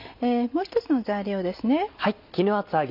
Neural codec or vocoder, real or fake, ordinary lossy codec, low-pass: none; real; none; 5.4 kHz